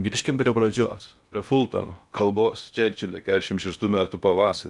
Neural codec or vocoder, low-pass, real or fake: codec, 16 kHz in and 24 kHz out, 0.8 kbps, FocalCodec, streaming, 65536 codes; 10.8 kHz; fake